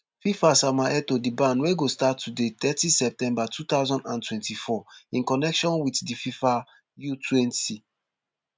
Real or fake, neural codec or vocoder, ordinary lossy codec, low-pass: real; none; none; none